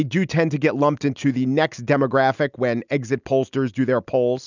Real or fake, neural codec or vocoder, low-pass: real; none; 7.2 kHz